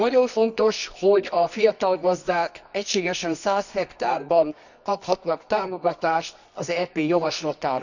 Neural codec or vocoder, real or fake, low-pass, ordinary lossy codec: codec, 24 kHz, 0.9 kbps, WavTokenizer, medium music audio release; fake; 7.2 kHz; none